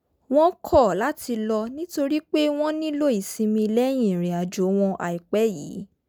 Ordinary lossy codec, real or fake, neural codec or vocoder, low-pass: none; real; none; none